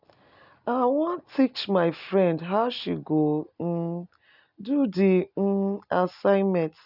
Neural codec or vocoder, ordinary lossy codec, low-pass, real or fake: none; none; 5.4 kHz; real